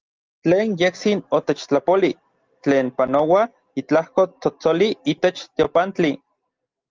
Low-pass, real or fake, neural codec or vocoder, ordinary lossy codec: 7.2 kHz; real; none; Opus, 32 kbps